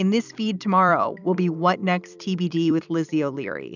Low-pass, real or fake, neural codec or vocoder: 7.2 kHz; fake; vocoder, 44.1 kHz, 128 mel bands every 256 samples, BigVGAN v2